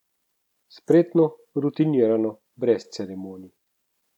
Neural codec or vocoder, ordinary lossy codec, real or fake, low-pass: none; none; real; 19.8 kHz